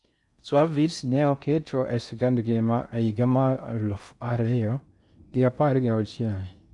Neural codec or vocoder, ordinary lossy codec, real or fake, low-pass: codec, 16 kHz in and 24 kHz out, 0.6 kbps, FocalCodec, streaming, 4096 codes; none; fake; 10.8 kHz